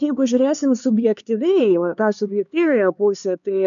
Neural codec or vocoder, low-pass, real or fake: codec, 16 kHz, 4 kbps, X-Codec, HuBERT features, trained on LibriSpeech; 7.2 kHz; fake